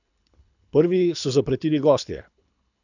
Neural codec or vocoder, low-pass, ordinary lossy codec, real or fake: codec, 24 kHz, 6 kbps, HILCodec; 7.2 kHz; none; fake